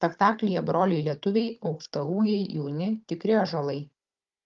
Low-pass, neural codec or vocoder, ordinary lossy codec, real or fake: 7.2 kHz; codec, 16 kHz, 16 kbps, FunCodec, trained on Chinese and English, 50 frames a second; Opus, 32 kbps; fake